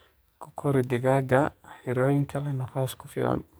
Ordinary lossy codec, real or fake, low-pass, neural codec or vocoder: none; fake; none; codec, 44.1 kHz, 2.6 kbps, SNAC